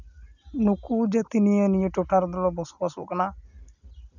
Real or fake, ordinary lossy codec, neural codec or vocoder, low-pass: real; none; none; 7.2 kHz